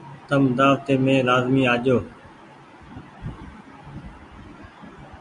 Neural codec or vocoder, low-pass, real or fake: none; 10.8 kHz; real